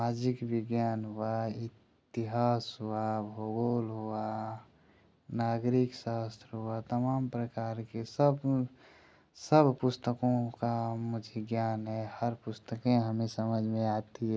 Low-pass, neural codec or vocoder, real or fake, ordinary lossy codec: none; none; real; none